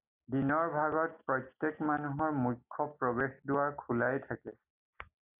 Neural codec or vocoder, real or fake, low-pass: none; real; 3.6 kHz